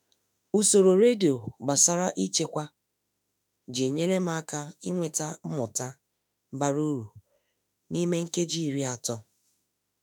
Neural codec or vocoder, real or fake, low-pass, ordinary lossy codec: autoencoder, 48 kHz, 32 numbers a frame, DAC-VAE, trained on Japanese speech; fake; none; none